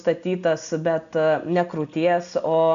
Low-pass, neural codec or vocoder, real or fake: 7.2 kHz; none; real